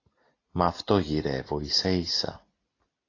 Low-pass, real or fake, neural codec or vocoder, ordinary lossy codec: 7.2 kHz; real; none; AAC, 32 kbps